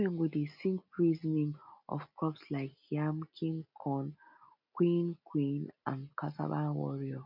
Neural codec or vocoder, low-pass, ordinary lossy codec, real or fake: none; 5.4 kHz; none; real